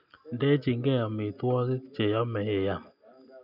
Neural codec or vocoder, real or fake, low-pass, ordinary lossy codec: none; real; 5.4 kHz; none